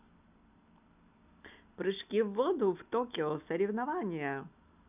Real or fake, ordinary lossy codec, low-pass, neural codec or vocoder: real; none; 3.6 kHz; none